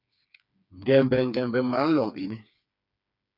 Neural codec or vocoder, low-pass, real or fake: codec, 16 kHz, 4 kbps, FreqCodec, smaller model; 5.4 kHz; fake